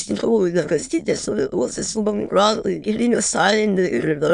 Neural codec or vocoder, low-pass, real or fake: autoencoder, 22.05 kHz, a latent of 192 numbers a frame, VITS, trained on many speakers; 9.9 kHz; fake